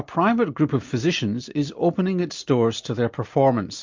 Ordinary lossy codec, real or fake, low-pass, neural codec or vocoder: MP3, 64 kbps; real; 7.2 kHz; none